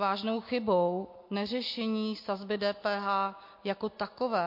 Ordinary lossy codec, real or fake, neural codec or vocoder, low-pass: MP3, 32 kbps; real; none; 5.4 kHz